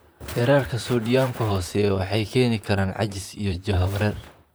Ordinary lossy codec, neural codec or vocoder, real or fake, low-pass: none; vocoder, 44.1 kHz, 128 mel bands, Pupu-Vocoder; fake; none